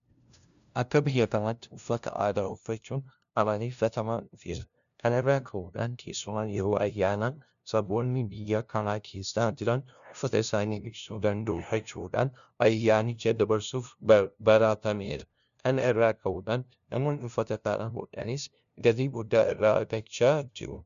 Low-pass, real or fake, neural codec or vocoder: 7.2 kHz; fake; codec, 16 kHz, 0.5 kbps, FunCodec, trained on LibriTTS, 25 frames a second